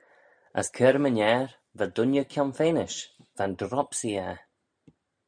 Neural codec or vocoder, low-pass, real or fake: none; 9.9 kHz; real